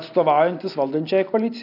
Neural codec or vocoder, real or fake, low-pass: none; real; 5.4 kHz